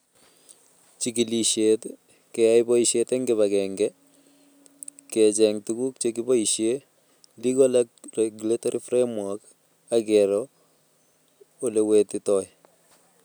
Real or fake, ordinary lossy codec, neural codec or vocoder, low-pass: real; none; none; none